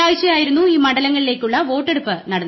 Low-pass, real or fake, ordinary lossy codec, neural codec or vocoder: 7.2 kHz; real; MP3, 24 kbps; none